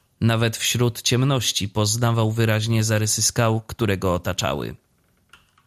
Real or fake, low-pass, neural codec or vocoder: real; 14.4 kHz; none